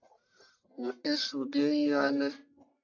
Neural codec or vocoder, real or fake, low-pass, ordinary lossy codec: codec, 44.1 kHz, 1.7 kbps, Pupu-Codec; fake; 7.2 kHz; AAC, 48 kbps